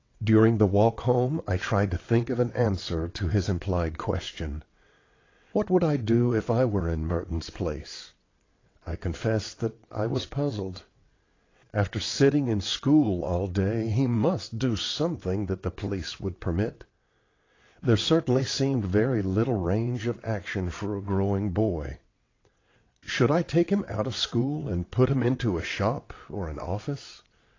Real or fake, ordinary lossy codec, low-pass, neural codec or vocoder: fake; AAC, 32 kbps; 7.2 kHz; vocoder, 22.05 kHz, 80 mel bands, WaveNeXt